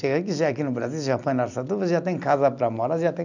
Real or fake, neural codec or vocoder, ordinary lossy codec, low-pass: real; none; none; 7.2 kHz